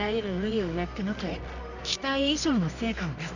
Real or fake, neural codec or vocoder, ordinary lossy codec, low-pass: fake; codec, 24 kHz, 0.9 kbps, WavTokenizer, medium music audio release; none; 7.2 kHz